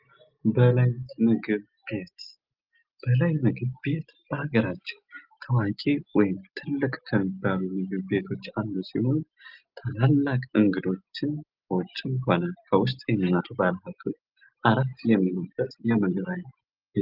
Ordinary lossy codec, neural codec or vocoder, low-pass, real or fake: Opus, 24 kbps; none; 5.4 kHz; real